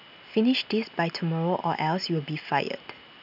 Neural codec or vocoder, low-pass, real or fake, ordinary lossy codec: none; 5.4 kHz; real; none